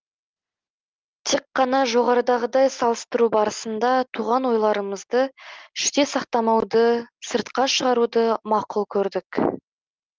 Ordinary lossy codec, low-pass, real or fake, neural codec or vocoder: Opus, 24 kbps; 7.2 kHz; real; none